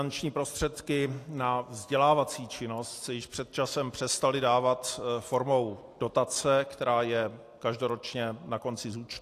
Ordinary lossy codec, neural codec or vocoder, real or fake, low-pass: AAC, 64 kbps; none; real; 14.4 kHz